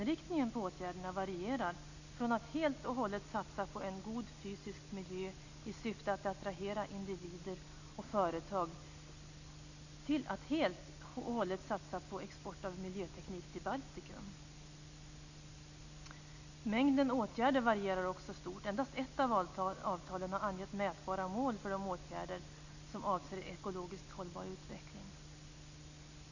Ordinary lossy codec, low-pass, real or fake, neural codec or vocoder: none; 7.2 kHz; real; none